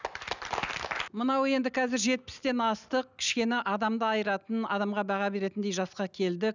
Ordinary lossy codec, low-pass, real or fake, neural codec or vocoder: none; 7.2 kHz; real; none